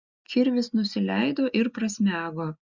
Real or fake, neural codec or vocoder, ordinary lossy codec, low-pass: real; none; Opus, 64 kbps; 7.2 kHz